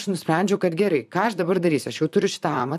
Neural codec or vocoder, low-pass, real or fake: vocoder, 44.1 kHz, 128 mel bands, Pupu-Vocoder; 14.4 kHz; fake